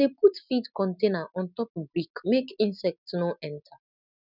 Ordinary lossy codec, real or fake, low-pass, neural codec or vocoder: none; real; 5.4 kHz; none